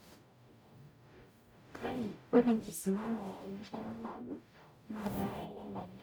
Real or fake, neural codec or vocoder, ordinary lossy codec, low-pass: fake; codec, 44.1 kHz, 0.9 kbps, DAC; none; none